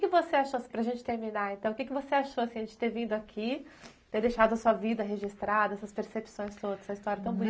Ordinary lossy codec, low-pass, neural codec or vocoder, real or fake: none; none; none; real